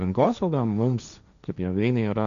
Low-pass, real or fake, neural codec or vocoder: 7.2 kHz; fake; codec, 16 kHz, 1.1 kbps, Voila-Tokenizer